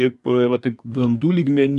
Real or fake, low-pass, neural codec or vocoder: fake; 10.8 kHz; codec, 24 kHz, 1 kbps, SNAC